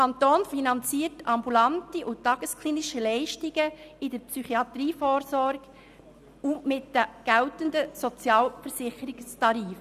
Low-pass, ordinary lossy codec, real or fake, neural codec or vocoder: 14.4 kHz; none; real; none